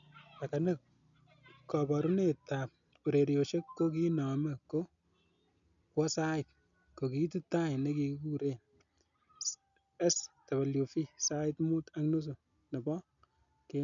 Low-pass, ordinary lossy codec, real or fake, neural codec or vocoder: 7.2 kHz; none; real; none